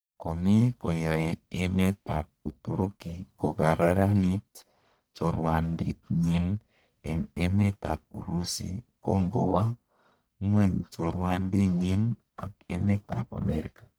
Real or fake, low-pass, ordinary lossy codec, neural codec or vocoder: fake; none; none; codec, 44.1 kHz, 1.7 kbps, Pupu-Codec